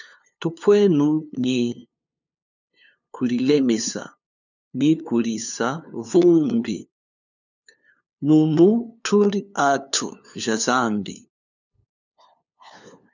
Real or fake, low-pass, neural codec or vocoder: fake; 7.2 kHz; codec, 16 kHz, 2 kbps, FunCodec, trained on LibriTTS, 25 frames a second